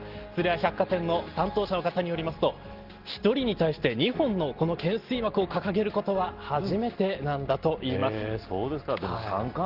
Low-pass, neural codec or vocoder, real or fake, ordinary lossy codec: 5.4 kHz; none; real; Opus, 16 kbps